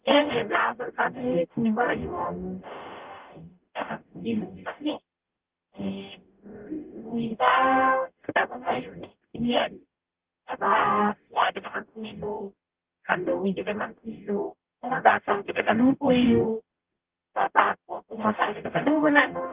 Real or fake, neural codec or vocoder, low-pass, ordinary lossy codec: fake; codec, 44.1 kHz, 0.9 kbps, DAC; 3.6 kHz; Opus, 32 kbps